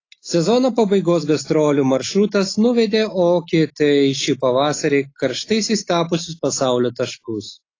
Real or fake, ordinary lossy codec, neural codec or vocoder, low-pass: real; AAC, 32 kbps; none; 7.2 kHz